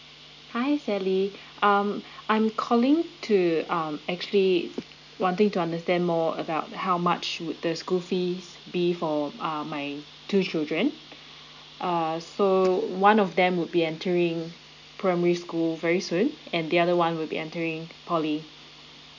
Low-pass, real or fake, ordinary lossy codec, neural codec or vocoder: 7.2 kHz; real; none; none